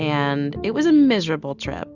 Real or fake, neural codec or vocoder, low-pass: real; none; 7.2 kHz